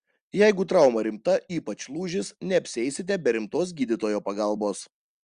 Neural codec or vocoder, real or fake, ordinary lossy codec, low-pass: none; real; Opus, 64 kbps; 9.9 kHz